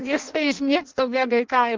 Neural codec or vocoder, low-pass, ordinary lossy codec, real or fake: codec, 16 kHz in and 24 kHz out, 0.6 kbps, FireRedTTS-2 codec; 7.2 kHz; Opus, 32 kbps; fake